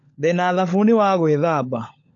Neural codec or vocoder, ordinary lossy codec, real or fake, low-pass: codec, 16 kHz, 16 kbps, FunCodec, trained on LibriTTS, 50 frames a second; AAC, 48 kbps; fake; 7.2 kHz